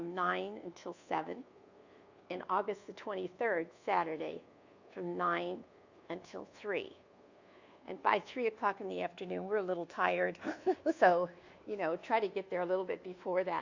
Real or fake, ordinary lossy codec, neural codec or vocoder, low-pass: fake; Opus, 64 kbps; codec, 24 kHz, 1.2 kbps, DualCodec; 7.2 kHz